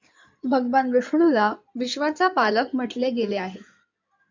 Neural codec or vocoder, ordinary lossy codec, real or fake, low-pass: codec, 16 kHz in and 24 kHz out, 2.2 kbps, FireRedTTS-2 codec; MP3, 64 kbps; fake; 7.2 kHz